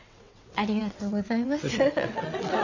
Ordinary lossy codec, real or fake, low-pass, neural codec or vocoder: none; fake; 7.2 kHz; vocoder, 22.05 kHz, 80 mel bands, WaveNeXt